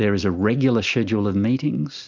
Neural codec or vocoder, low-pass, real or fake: none; 7.2 kHz; real